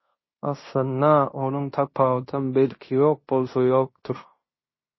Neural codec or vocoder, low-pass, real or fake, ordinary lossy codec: codec, 16 kHz in and 24 kHz out, 0.9 kbps, LongCat-Audio-Codec, fine tuned four codebook decoder; 7.2 kHz; fake; MP3, 24 kbps